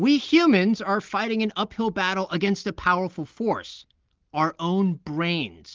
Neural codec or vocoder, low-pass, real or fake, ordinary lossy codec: none; 7.2 kHz; real; Opus, 16 kbps